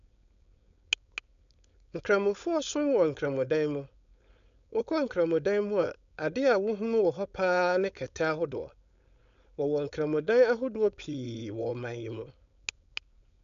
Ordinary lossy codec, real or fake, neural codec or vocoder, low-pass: none; fake; codec, 16 kHz, 4.8 kbps, FACodec; 7.2 kHz